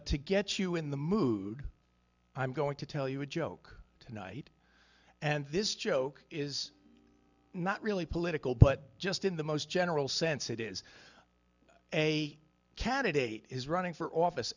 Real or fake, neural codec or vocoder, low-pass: real; none; 7.2 kHz